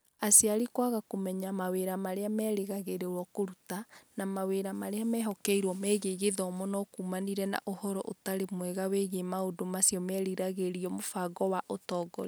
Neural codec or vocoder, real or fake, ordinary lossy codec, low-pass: none; real; none; none